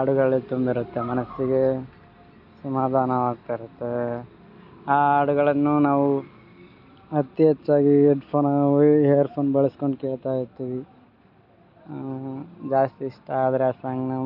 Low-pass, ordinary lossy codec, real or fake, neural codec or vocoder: 5.4 kHz; none; real; none